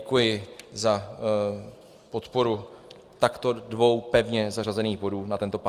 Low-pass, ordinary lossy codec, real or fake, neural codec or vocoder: 14.4 kHz; Opus, 32 kbps; real; none